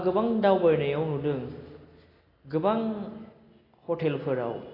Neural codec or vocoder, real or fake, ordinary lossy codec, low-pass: none; real; AAC, 24 kbps; 5.4 kHz